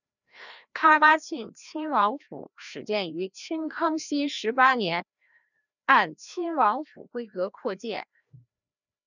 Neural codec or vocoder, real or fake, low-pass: codec, 16 kHz, 1 kbps, FreqCodec, larger model; fake; 7.2 kHz